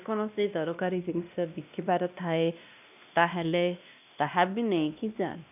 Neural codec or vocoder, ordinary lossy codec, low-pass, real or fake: codec, 16 kHz, 1 kbps, X-Codec, WavLM features, trained on Multilingual LibriSpeech; none; 3.6 kHz; fake